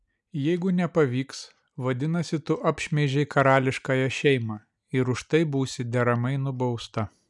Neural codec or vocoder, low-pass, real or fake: none; 10.8 kHz; real